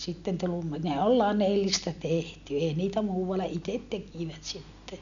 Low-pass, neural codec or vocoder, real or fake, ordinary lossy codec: 7.2 kHz; none; real; none